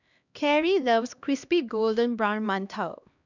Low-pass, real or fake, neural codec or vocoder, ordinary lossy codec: 7.2 kHz; fake; codec, 16 kHz, 1 kbps, X-Codec, HuBERT features, trained on LibriSpeech; none